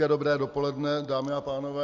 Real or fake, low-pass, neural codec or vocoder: real; 7.2 kHz; none